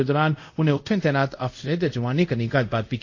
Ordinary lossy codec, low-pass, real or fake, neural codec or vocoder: AAC, 48 kbps; 7.2 kHz; fake; codec, 24 kHz, 0.5 kbps, DualCodec